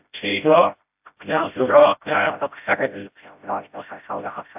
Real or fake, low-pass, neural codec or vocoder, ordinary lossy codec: fake; 3.6 kHz; codec, 16 kHz, 0.5 kbps, FreqCodec, smaller model; AAC, 32 kbps